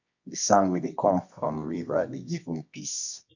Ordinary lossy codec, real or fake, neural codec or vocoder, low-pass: none; fake; codec, 24 kHz, 0.9 kbps, WavTokenizer, medium music audio release; 7.2 kHz